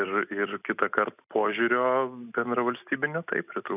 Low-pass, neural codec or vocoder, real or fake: 3.6 kHz; none; real